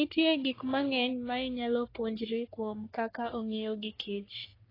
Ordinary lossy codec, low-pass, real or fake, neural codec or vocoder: AAC, 24 kbps; 5.4 kHz; fake; codec, 44.1 kHz, 3.4 kbps, Pupu-Codec